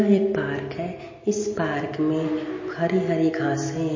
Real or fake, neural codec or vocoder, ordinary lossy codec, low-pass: real; none; MP3, 32 kbps; 7.2 kHz